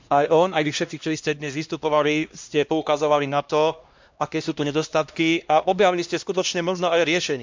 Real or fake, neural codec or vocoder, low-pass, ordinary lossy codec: fake; codec, 16 kHz, 1 kbps, X-Codec, HuBERT features, trained on LibriSpeech; 7.2 kHz; MP3, 64 kbps